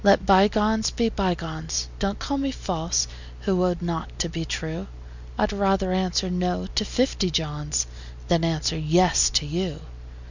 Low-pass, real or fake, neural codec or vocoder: 7.2 kHz; real; none